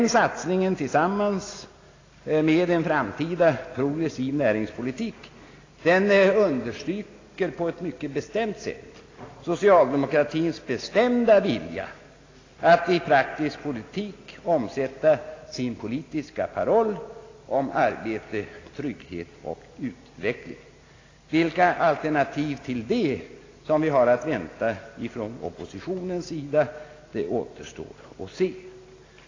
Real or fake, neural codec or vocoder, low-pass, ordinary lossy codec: real; none; 7.2 kHz; AAC, 32 kbps